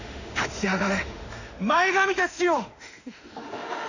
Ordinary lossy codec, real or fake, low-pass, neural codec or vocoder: none; fake; 7.2 kHz; autoencoder, 48 kHz, 32 numbers a frame, DAC-VAE, trained on Japanese speech